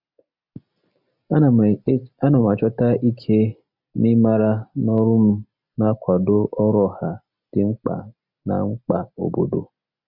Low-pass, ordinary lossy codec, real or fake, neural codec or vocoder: 5.4 kHz; none; real; none